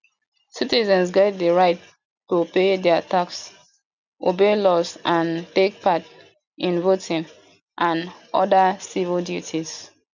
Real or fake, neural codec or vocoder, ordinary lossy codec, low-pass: real; none; none; 7.2 kHz